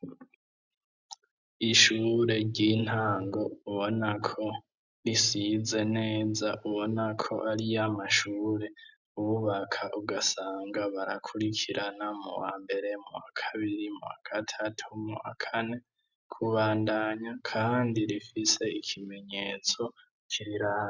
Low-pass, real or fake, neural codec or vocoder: 7.2 kHz; real; none